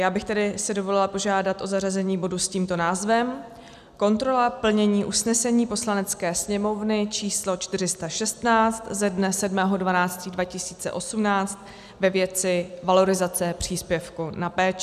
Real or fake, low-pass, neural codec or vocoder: real; 14.4 kHz; none